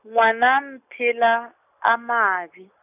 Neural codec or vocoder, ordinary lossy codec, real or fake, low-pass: none; none; real; 3.6 kHz